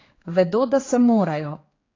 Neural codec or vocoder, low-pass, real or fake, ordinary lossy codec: codec, 16 kHz, 4 kbps, X-Codec, HuBERT features, trained on general audio; 7.2 kHz; fake; AAC, 32 kbps